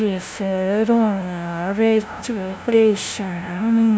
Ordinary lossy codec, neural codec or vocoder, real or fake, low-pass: none; codec, 16 kHz, 0.5 kbps, FunCodec, trained on LibriTTS, 25 frames a second; fake; none